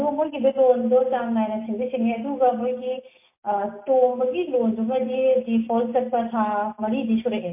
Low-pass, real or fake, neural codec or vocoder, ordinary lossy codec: 3.6 kHz; real; none; MP3, 24 kbps